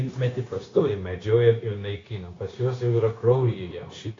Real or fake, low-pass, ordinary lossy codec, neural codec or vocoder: fake; 7.2 kHz; AAC, 32 kbps; codec, 16 kHz, 0.9 kbps, LongCat-Audio-Codec